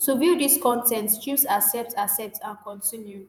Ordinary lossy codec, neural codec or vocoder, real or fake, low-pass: none; vocoder, 48 kHz, 128 mel bands, Vocos; fake; none